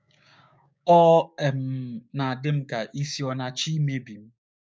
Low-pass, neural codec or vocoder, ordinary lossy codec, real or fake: none; codec, 16 kHz, 6 kbps, DAC; none; fake